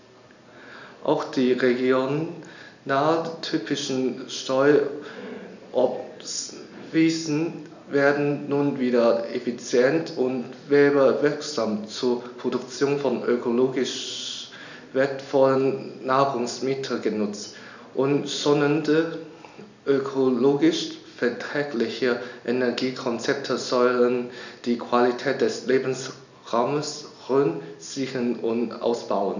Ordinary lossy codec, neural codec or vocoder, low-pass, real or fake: none; none; 7.2 kHz; real